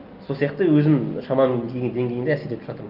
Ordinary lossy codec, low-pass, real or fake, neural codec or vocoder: Opus, 24 kbps; 5.4 kHz; real; none